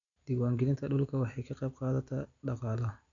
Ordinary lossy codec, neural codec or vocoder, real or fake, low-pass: none; none; real; 7.2 kHz